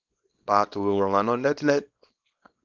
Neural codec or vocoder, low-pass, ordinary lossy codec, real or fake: codec, 24 kHz, 0.9 kbps, WavTokenizer, small release; 7.2 kHz; Opus, 24 kbps; fake